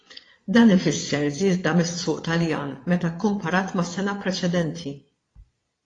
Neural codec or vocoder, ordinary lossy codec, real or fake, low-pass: vocoder, 22.05 kHz, 80 mel bands, Vocos; AAC, 32 kbps; fake; 9.9 kHz